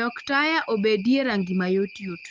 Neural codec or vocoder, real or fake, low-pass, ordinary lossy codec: none; real; 7.2 kHz; Opus, 32 kbps